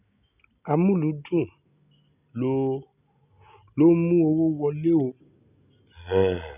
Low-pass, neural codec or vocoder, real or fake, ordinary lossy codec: 3.6 kHz; none; real; none